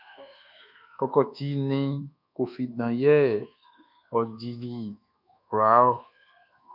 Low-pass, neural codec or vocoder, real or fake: 5.4 kHz; codec, 24 kHz, 1.2 kbps, DualCodec; fake